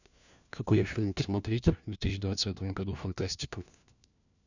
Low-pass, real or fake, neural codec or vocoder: 7.2 kHz; fake; codec, 16 kHz, 1 kbps, FunCodec, trained on LibriTTS, 50 frames a second